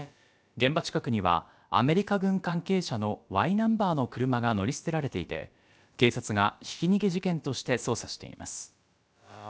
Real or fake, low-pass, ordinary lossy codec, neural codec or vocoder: fake; none; none; codec, 16 kHz, about 1 kbps, DyCAST, with the encoder's durations